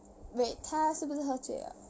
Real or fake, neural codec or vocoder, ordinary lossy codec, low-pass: real; none; none; none